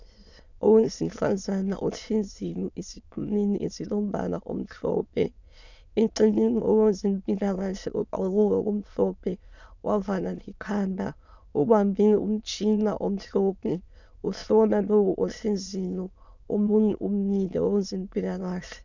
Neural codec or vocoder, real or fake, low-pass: autoencoder, 22.05 kHz, a latent of 192 numbers a frame, VITS, trained on many speakers; fake; 7.2 kHz